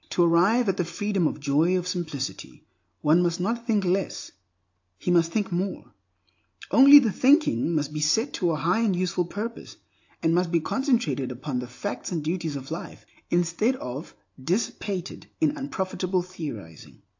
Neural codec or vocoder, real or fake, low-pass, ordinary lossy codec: none; real; 7.2 kHz; AAC, 48 kbps